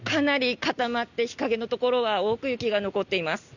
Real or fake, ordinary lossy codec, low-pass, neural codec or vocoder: real; none; 7.2 kHz; none